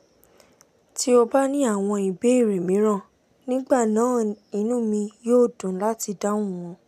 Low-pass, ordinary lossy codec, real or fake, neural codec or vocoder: 14.4 kHz; none; real; none